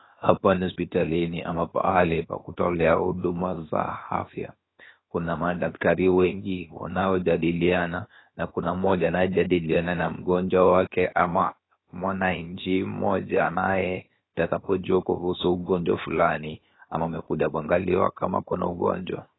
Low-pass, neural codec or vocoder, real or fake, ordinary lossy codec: 7.2 kHz; codec, 16 kHz, 0.7 kbps, FocalCodec; fake; AAC, 16 kbps